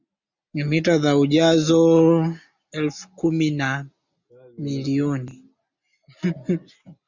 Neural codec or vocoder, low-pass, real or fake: none; 7.2 kHz; real